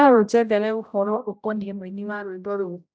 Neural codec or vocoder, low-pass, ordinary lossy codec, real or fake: codec, 16 kHz, 0.5 kbps, X-Codec, HuBERT features, trained on general audio; none; none; fake